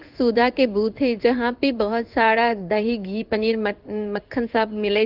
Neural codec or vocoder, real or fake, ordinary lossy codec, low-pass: codec, 16 kHz in and 24 kHz out, 1 kbps, XY-Tokenizer; fake; Opus, 24 kbps; 5.4 kHz